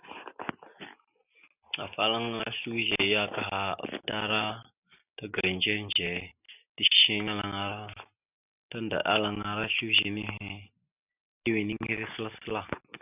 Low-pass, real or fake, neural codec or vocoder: 3.6 kHz; real; none